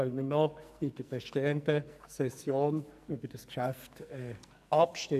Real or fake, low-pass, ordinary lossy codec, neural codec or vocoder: fake; 14.4 kHz; none; codec, 44.1 kHz, 2.6 kbps, SNAC